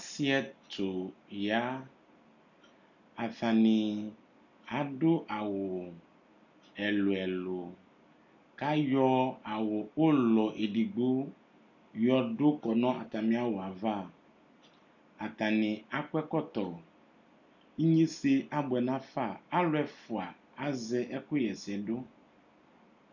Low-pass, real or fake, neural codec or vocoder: 7.2 kHz; real; none